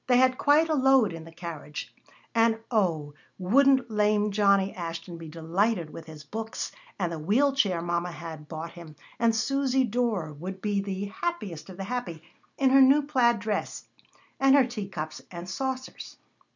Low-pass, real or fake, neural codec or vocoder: 7.2 kHz; real; none